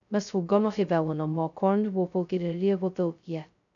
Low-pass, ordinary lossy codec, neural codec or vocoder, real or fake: 7.2 kHz; none; codec, 16 kHz, 0.2 kbps, FocalCodec; fake